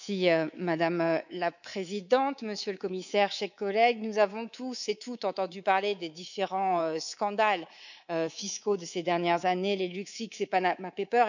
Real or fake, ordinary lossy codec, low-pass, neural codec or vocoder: fake; none; 7.2 kHz; codec, 24 kHz, 3.1 kbps, DualCodec